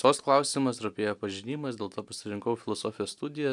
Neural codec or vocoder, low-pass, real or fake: none; 10.8 kHz; real